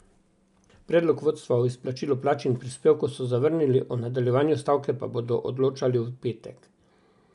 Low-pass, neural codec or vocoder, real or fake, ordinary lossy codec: 10.8 kHz; none; real; none